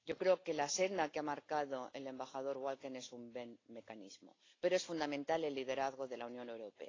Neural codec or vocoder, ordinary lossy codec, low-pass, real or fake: none; AAC, 32 kbps; 7.2 kHz; real